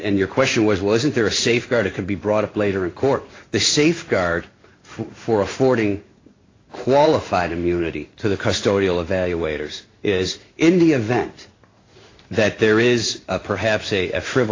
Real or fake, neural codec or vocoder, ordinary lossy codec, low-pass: fake; codec, 16 kHz in and 24 kHz out, 1 kbps, XY-Tokenizer; AAC, 32 kbps; 7.2 kHz